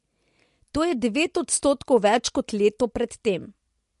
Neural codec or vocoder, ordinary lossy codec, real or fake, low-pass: none; MP3, 48 kbps; real; 14.4 kHz